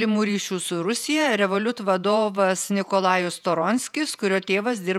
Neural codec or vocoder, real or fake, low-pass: vocoder, 48 kHz, 128 mel bands, Vocos; fake; 19.8 kHz